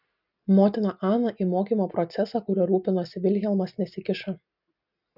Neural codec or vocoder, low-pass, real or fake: none; 5.4 kHz; real